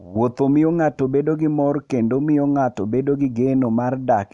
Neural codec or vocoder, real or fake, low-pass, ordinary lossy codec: none; real; 10.8 kHz; none